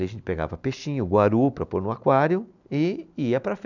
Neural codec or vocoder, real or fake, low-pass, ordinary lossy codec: none; real; 7.2 kHz; none